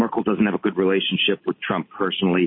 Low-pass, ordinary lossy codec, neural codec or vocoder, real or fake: 5.4 kHz; MP3, 24 kbps; none; real